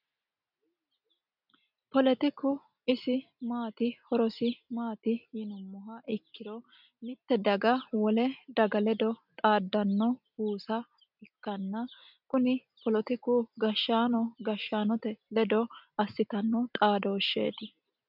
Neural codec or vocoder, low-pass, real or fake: none; 5.4 kHz; real